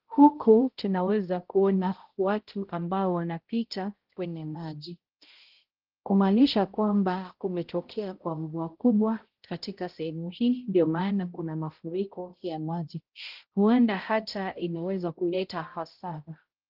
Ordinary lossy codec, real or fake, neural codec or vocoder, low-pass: Opus, 16 kbps; fake; codec, 16 kHz, 0.5 kbps, X-Codec, HuBERT features, trained on balanced general audio; 5.4 kHz